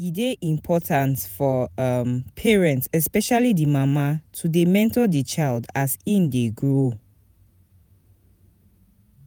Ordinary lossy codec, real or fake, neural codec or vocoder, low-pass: none; fake; vocoder, 48 kHz, 128 mel bands, Vocos; none